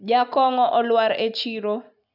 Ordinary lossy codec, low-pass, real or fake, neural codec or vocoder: none; 5.4 kHz; real; none